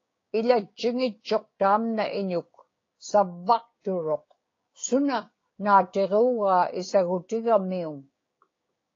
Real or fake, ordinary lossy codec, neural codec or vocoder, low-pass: fake; AAC, 32 kbps; codec, 16 kHz, 6 kbps, DAC; 7.2 kHz